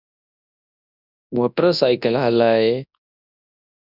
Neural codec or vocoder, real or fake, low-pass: codec, 24 kHz, 0.9 kbps, WavTokenizer, large speech release; fake; 5.4 kHz